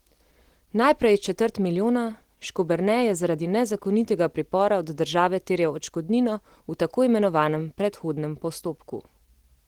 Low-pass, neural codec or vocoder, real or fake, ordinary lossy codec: 19.8 kHz; none; real; Opus, 16 kbps